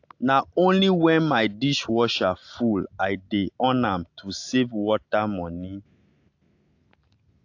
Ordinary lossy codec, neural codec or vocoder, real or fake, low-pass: AAC, 48 kbps; none; real; 7.2 kHz